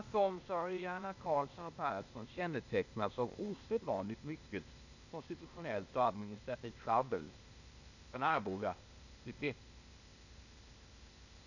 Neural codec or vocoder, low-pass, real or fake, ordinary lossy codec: codec, 16 kHz, 0.8 kbps, ZipCodec; 7.2 kHz; fake; none